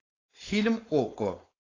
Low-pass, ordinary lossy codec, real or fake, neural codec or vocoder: 7.2 kHz; AAC, 32 kbps; fake; codec, 16 kHz, 4.8 kbps, FACodec